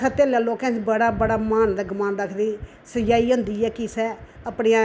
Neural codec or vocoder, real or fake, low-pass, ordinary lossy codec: none; real; none; none